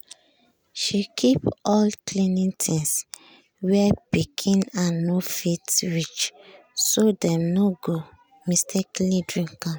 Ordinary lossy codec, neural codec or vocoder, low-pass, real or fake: none; none; none; real